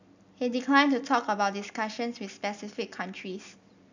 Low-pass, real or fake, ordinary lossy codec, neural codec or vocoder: 7.2 kHz; real; none; none